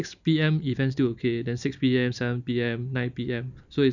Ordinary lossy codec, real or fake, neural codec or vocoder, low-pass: none; real; none; 7.2 kHz